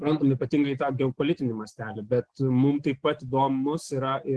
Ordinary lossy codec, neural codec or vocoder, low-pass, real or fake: Opus, 16 kbps; none; 10.8 kHz; real